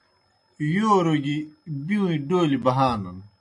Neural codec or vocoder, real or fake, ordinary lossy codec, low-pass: none; real; AAC, 64 kbps; 10.8 kHz